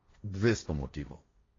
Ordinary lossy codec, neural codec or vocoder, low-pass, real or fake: AAC, 32 kbps; codec, 16 kHz, 1.1 kbps, Voila-Tokenizer; 7.2 kHz; fake